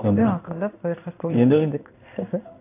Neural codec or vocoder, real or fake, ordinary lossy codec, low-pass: codec, 16 kHz in and 24 kHz out, 1 kbps, XY-Tokenizer; fake; AAC, 24 kbps; 3.6 kHz